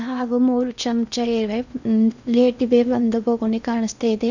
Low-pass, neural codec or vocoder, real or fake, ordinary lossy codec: 7.2 kHz; codec, 16 kHz in and 24 kHz out, 0.8 kbps, FocalCodec, streaming, 65536 codes; fake; none